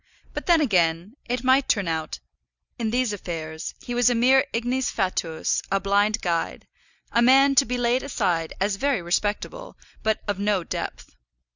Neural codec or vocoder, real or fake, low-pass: none; real; 7.2 kHz